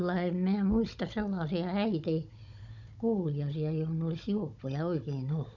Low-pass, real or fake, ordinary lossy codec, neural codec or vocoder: 7.2 kHz; fake; none; codec, 16 kHz, 16 kbps, FunCodec, trained on Chinese and English, 50 frames a second